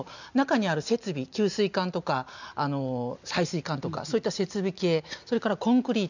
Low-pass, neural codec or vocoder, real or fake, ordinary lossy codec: 7.2 kHz; none; real; none